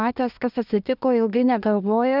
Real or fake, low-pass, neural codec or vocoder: fake; 5.4 kHz; codec, 24 kHz, 1 kbps, SNAC